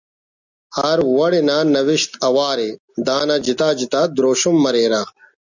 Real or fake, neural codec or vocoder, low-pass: real; none; 7.2 kHz